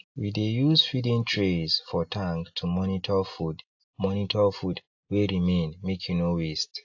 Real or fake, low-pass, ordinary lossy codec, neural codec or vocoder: real; 7.2 kHz; AAC, 48 kbps; none